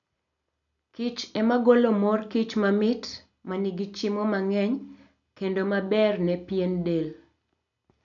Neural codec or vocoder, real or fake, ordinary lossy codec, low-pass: none; real; none; 7.2 kHz